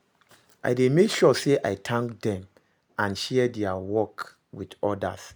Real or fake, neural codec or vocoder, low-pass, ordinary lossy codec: real; none; none; none